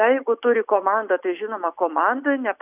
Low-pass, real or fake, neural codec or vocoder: 3.6 kHz; real; none